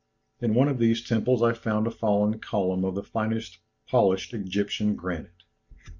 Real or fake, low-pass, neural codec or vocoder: real; 7.2 kHz; none